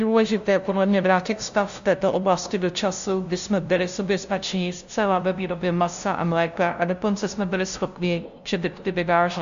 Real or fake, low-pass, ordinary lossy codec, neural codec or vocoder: fake; 7.2 kHz; MP3, 64 kbps; codec, 16 kHz, 0.5 kbps, FunCodec, trained on LibriTTS, 25 frames a second